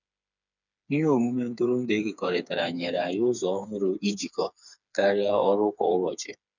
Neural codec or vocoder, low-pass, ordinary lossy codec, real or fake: codec, 16 kHz, 4 kbps, FreqCodec, smaller model; 7.2 kHz; none; fake